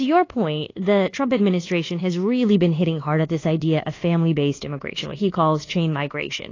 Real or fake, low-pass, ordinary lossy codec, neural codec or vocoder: fake; 7.2 kHz; AAC, 32 kbps; codec, 24 kHz, 1.2 kbps, DualCodec